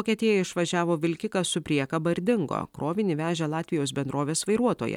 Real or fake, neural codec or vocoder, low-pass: fake; vocoder, 44.1 kHz, 128 mel bands every 256 samples, BigVGAN v2; 19.8 kHz